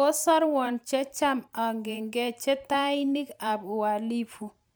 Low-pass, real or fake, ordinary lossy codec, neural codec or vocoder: none; fake; none; vocoder, 44.1 kHz, 128 mel bands every 512 samples, BigVGAN v2